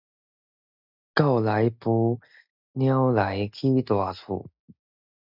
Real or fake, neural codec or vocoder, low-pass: real; none; 5.4 kHz